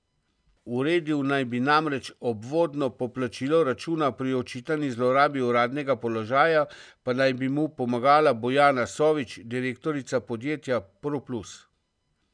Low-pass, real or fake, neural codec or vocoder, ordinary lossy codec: 9.9 kHz; real; none; none